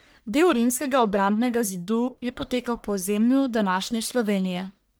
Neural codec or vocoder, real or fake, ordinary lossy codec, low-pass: codec, 44.1 kHz, 1.7 kbps, Pupu-Codec; fake; none; none